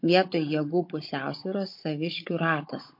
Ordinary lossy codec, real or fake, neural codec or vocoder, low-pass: MP3, 32 kbps; fake; codec, 16 kHz, 16 kbps, FunCodec, trained on Chinese and English, 50 frames a second; 5.4 kHz